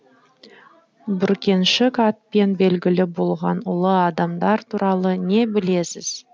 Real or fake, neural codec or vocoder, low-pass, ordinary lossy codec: real; none; none; none